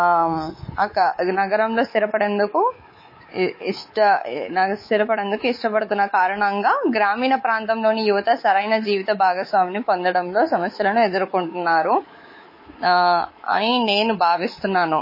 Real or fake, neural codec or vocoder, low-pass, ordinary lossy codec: fake; codec, 24 kHz, 3.1 kbps, DualCodec; 5.4 kHz; MP3, 24 kbps